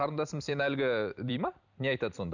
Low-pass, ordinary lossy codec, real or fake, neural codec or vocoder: 7.2 kHz; none; fake; vocoder, 44.1 kHz, 128 mel bands every 256 samples, BigVGAN v2